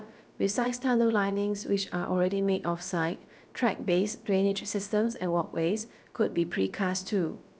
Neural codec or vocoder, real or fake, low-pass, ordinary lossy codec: codec, 16 kHz, about 1 kbps, DyCAST, with the encoder's durations; fake; none; none